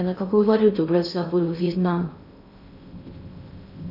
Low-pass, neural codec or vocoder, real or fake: 5.4 kHz; codec, 16 kHz in and 24 kHz out, 0.6 kbps, FocalCodec, streaming, 2048 codes; fake